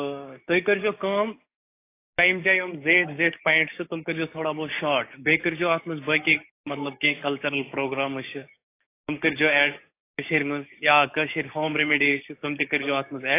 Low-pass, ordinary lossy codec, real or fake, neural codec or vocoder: 3.6 kHz; AAC, 24 kbps; fake; codec, 16 kHz, 6 kbps, DAC